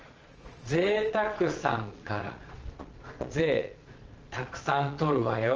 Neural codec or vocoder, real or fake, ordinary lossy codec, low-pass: vocoder, 22.05 kHz, 80 mel bands, Vocos; fake; Opus, 24 kbps; 7.2 kHz